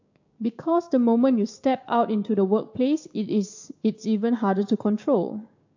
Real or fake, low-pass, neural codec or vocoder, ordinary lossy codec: fake; 7.2 kHz; codec, 16 kHz, 6 kbps, DAC; AAC, 48 kbps